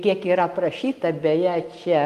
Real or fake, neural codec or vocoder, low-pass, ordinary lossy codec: real; none; 14.4 kHz; Opus, 24 kbps